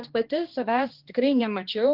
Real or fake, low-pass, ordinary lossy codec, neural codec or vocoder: fake; 5.4 kHz; Opus, 16 kbps; codec, 16 kHz, 2 kbps, X-Codec, HuBERT features, trained on balanced general audio